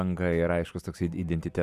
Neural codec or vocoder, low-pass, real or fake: none; 14.4 kHz; real